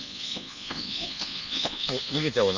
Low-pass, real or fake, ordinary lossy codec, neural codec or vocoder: 7.2 kHz; fake; none; codec, 24 kHz, 1.2 kbps, DualCodec